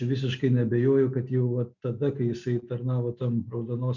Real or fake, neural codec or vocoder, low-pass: real; none; 7.2 kHz